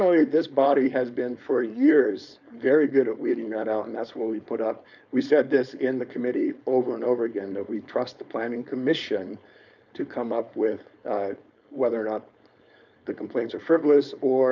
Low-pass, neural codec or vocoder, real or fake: 7.2 kHz; codec, 16 kHz, 4.8 kbps, FACodec; fake